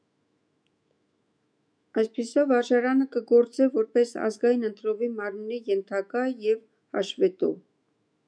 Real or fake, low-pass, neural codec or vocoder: fake; 9.9 kHz; autoencoder, 48 kHz, 128 numbers a frame, DAC-VAE, trained on Japanese speech